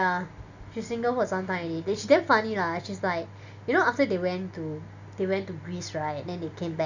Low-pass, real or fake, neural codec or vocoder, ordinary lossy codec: 7.2 kHz; real; none; none